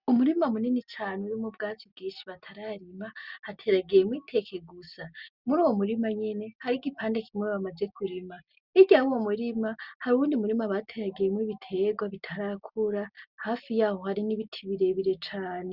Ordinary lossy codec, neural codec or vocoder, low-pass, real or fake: Opus, 64 kbps; none; 5.4 kHz; real